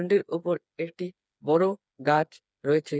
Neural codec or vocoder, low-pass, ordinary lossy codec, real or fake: codec, 16 kHz, 4 kbps, FreqCodec, smaller model; none; none; fake